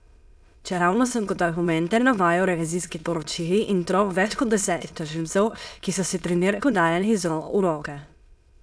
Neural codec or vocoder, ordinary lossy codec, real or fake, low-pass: autoencoder, 22.05 kHz, a latent of 192 numbers a frame, VITS, trained on many speakers; none; fake; none